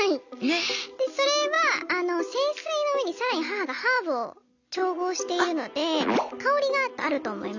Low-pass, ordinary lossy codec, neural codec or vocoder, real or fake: 7.2 kHz; none; none; real